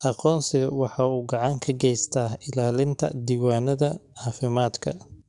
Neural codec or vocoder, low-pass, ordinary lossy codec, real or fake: codec, 44.1 kHz, 7.8 kbps, DAC; 10.8 kHz; none; fake